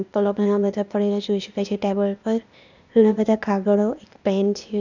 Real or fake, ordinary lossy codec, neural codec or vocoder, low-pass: fake; none; codec, 16 kHz, 0.8 kbps, ZipCodec; 7.2 kHz